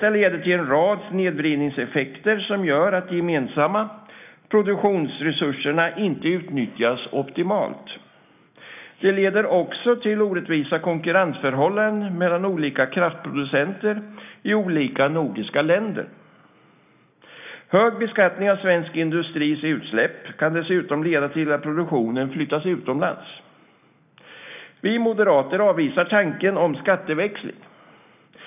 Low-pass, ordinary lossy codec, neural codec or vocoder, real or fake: 3.6 kHz; none; none; real